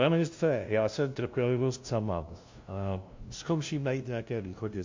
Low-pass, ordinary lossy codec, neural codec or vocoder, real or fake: 7.2 kHz; MP3, 48 kbps; codec, 16 kHz, 0.5 kbps, FunCodec, trained on LibriTTS, 25 frames a second; fake